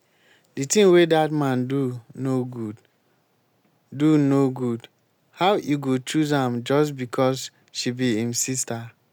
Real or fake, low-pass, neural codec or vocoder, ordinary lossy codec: real; none; none; none